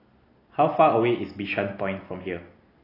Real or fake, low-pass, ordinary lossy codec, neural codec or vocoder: real; 5.4 kHz; AAC, 24 kbps; none